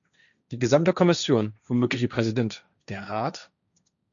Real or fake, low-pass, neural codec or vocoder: fake; 7.2 kHz; codec, 16 kHz, 1.1 kbps, Voila-Tokenizer